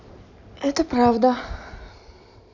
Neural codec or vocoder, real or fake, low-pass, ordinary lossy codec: none; real; 7.2 kHz; none